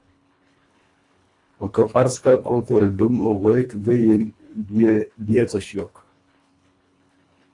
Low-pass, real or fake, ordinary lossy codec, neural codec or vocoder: 10.8 kHz; fake; AAC, 48 kbps; codec, 24 kHz, 1.5 kbps, HILCodec